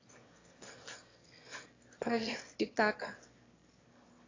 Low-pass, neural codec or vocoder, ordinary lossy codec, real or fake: 7.2 kHz; autoencoder, 22.05 kHz, a latent of 192 numbers a frame, VITS, trained on one speaker; AAC, 32 kbps; fake